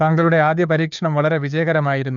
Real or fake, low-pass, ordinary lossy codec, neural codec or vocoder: fake; 7.2 kHz; none; codec, 16 kHz, 2 kbps, FunCodec, trained on Chinese and English, 25 frames a second